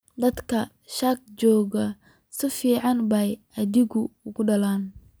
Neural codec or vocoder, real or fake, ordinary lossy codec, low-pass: none; real; none; none